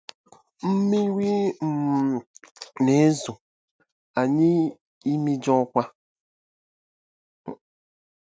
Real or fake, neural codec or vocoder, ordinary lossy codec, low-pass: real; none; none; none